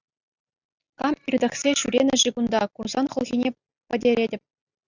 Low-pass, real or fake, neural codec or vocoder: 7.2 kHz; real; none